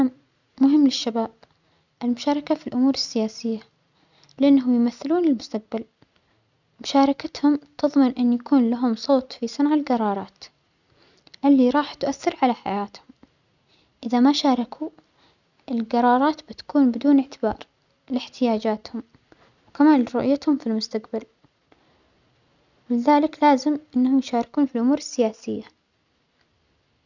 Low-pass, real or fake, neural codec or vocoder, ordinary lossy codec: 7.2 kHz; real; none; none